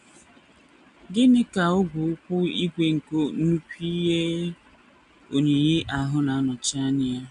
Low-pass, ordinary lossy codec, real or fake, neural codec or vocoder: 10.8 kHz; none; real; none